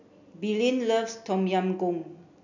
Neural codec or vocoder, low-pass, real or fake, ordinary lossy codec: none; 7.2 kHz; real; none